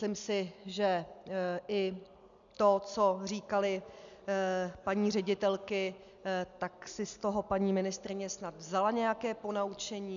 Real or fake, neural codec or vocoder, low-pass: real; none; 7.2 kHz